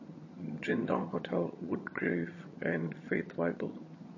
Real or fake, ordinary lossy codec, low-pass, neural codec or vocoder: fake; MP3, 32 kbps; 7.2 kHz; vocoder, 22.05 kHz, 80 mel bands, HiFi-GAN